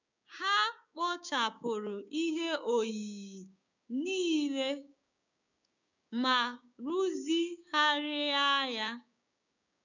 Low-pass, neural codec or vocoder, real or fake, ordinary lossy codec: 7.2 kHz; codec, 16 kHz, 6 kbps, DAC; fake; none